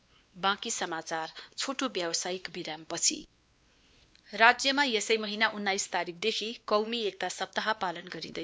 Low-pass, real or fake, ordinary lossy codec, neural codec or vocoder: none; fake; none; codec, 16 kHz, 2 kbps, X-Codec, WavLM features, trained on Multilingual LibriSpeech